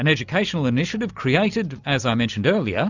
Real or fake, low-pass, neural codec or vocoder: real; 7.2 kHz; none